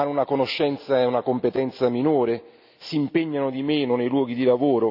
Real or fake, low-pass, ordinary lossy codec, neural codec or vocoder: real; 5.4 kHz; none; none